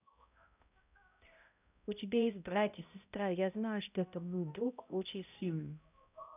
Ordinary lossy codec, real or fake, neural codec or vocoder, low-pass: none; fake; codec, 16 kHz, 0.5 kbps, X-Codec, HuBERT features, trained on balanced general audio; 3.6 kHz